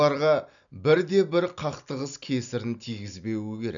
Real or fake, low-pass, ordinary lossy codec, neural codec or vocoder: real; 7.2 kHz; none; none